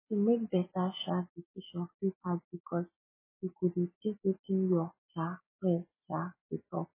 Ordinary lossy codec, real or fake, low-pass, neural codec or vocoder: MP3, 16 kbps; real; 3.6 kHz; none